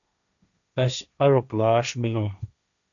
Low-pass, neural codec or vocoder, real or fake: 7.2 kHz; codec, 16 kHz, 1.1 kbps, Voila-Tokenizer; fake